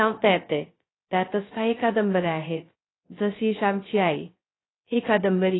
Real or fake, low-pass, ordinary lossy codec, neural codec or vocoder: fake; 7.2 kHz; AAC, 16 kbps; codec, 16 kHz, 0.2 kbps, FocalCodec